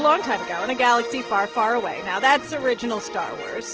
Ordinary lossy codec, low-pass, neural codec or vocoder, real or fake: Opus, 16 kbps; 7.2 kHz; none; real